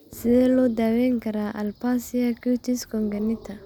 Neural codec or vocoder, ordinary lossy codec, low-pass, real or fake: none; none; none; real